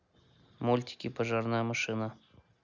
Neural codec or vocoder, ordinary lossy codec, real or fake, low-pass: none; none; real; 7.2 kHz